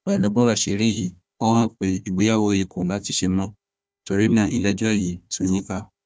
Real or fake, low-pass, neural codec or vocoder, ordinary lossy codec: fake; none; codec, 16 kHz, 1 kbps, FunCodec, trained on Chinese and English, 50 frames a second; none